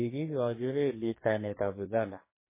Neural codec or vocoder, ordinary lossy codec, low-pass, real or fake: codec, 44.1 kHz, 2.6 kbps, SNAC; MP3, 16 kbps; 3.6 kHz; fake